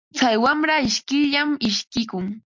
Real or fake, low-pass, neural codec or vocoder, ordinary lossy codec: real; 7.2 kHz; none; MP3, 48 kbps